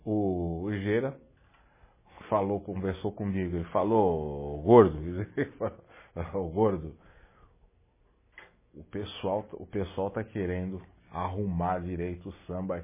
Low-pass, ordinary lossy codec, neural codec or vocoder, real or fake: 3.6 kHz; MP3, 16 kbps; none; real